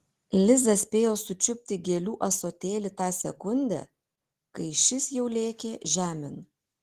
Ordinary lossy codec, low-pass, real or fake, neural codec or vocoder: Opus, 16 kbps; 14.4 kHz; real; none